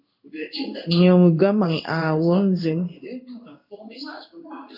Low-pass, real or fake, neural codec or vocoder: 5.4 kHz; fake; codec, 16 kHz in and 24 kHz out, 1 kbps, XY-Tokenizer